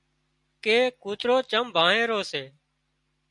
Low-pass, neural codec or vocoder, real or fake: 10.8 kHz; none; real